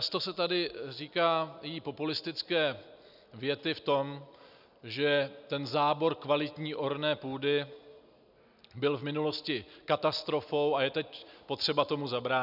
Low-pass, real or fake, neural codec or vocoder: 5.4 kHz; real; none